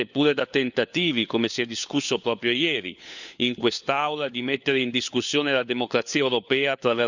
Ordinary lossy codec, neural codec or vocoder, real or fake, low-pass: none; codec, 16 kHz, 4 kbps, FunCodec, trained on LibriTTS, 50 frames a second; fake; 7.2 kHz